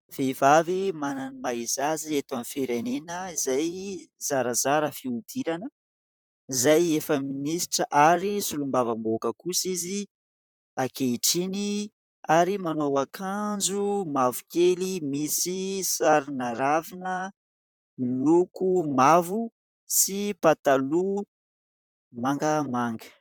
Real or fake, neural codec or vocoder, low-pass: fake; vocoder, 44.1 kHz, 128 mel bands, Pupu-Vocoder; 19.8 kHz